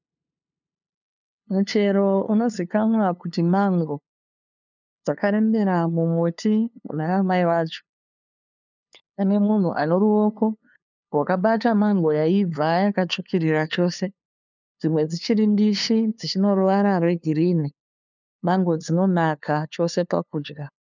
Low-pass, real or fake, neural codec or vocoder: 7.2 kHz; fake; codec, 16 kHz, 2 kbps, FunCodec, trained on LibriTTS, 25 frames a second